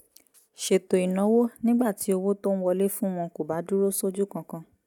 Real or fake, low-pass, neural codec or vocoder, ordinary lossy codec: real; 19.8 kHz; none; none